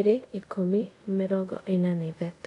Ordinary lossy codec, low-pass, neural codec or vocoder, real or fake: none; 10.8 kHz; codec, 24 kHz, 0.5 kbps, DualCodec; fake